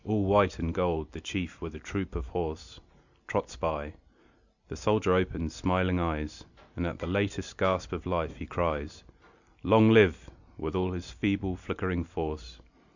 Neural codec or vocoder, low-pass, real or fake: none; 7.2 kHz; real